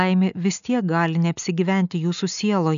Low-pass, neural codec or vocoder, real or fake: 7.2 kHz; none; real